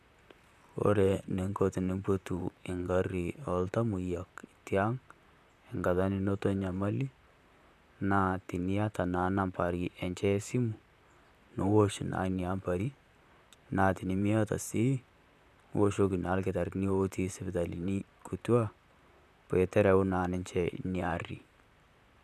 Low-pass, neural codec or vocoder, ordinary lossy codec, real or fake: 14.4 kHz; vocoder, 44.1 kHz, 128 mel bands, Pupu-Vocoder; none; fake